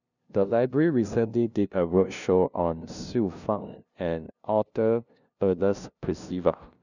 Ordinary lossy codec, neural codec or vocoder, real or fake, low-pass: none; codec, 16 kHz, 0.5 kbps, FunCodec, trained on LibriTTS, 25 frames a second; fake; 7.2 kHz